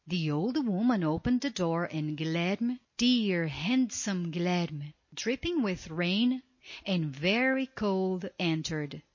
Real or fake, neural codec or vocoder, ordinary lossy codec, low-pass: real; none; MP3, 32 kbps; 7.2 kHz